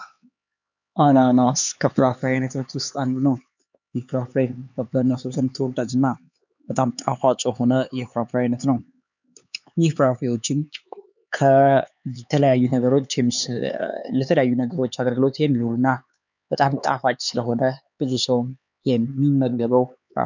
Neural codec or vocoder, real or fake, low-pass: codec, 16 kHz, 4 kbps, X-Codec, HuBERT features, trained on LibriSpeech; fake; 7.2 kHz